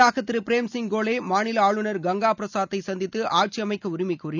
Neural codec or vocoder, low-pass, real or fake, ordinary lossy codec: none; 7.2 kHz; real; none